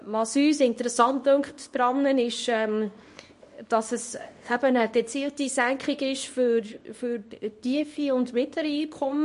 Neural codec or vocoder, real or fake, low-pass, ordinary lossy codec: codec, 24 kHz, 0.9 kbps, WavTokenizer, small release; fake; 10.8 kHz; MP3, 48 kbps